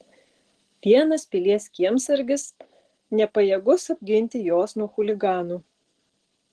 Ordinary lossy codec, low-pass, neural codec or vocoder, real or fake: Opus, 16 kbps; 10.8 kHz; none; real